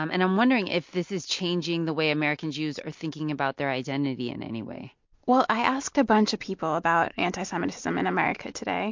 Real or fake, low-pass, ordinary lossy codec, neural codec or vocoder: real; 7.2 kHz; MP3, 48 kbps; none